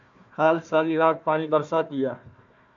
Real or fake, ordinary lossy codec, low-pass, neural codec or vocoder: fake; AAC, 64 kbps; 7.2 kHz; codec, 16 kHz, 1 kbps, FunCodec, trained on Chinese and English, 50 frames a second